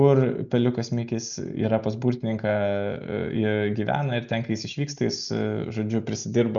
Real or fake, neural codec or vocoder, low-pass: real; none; 7.2 kHz